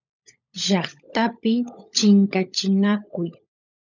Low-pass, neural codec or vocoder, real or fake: 7.2 kHz; codec, 16 kHz, 16 kbps, FunCodec, trained on LibriTTS, 50 frames a second; fake